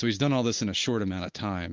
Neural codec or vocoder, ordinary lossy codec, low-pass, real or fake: none; Opus, 32 kbps; 7.2 kHz; real